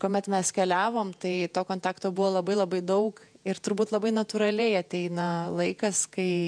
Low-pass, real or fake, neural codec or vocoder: 9.9 kHz; fake; vocoder, 48 kHz, 128 mel bands, Vocos